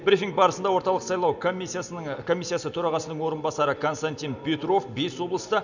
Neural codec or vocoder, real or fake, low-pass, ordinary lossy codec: none; real; 7.2 kHz; none